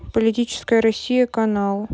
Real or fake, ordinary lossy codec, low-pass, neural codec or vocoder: real; none; none; none